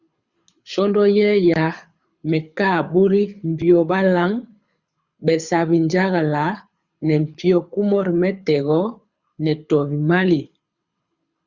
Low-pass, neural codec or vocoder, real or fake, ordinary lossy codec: 7.2 kHz; codec, 24 kHz, 6 kbps, HILCodec; fake; Opus, 64 kbps